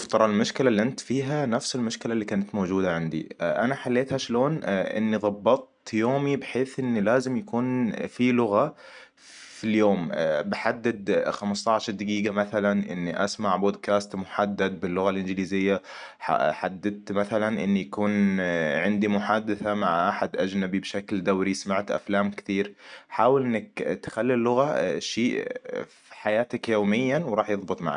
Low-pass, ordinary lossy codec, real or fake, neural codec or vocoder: 9.9 kHz; none; real; none